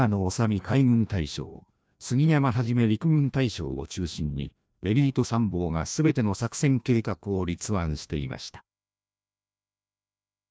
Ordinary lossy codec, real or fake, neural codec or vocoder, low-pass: none; fake; codec, 16 kHz, 1 kbps, FreqCodec, larger model; none